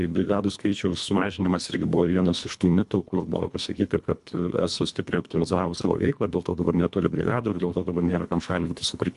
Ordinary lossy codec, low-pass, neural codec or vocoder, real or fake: MP3, 96 kbps; 10.8 kHz; codec, 24 kHz, 1.5 kbps, HILCodec; fake